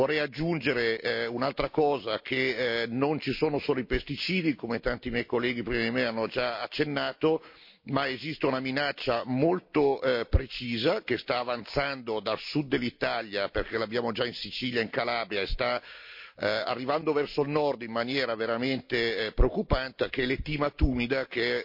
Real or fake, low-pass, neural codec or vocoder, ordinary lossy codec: real; 5.4 kHz; none; MP3, 32 kbps